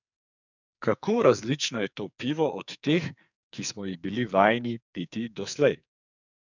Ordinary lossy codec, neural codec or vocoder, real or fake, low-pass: none; codec, 44.1 kHz, 2.6 kbps, SNAC; fake; 7.2 kHz